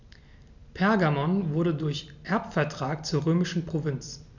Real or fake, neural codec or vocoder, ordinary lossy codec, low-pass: real; none; none; 7.2 kHz